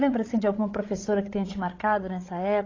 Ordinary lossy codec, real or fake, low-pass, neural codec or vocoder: AAC, 32 kbps; fake; 7.2 kHz; codec, 16 kHz, 16 kbps, FreqCodec, larger model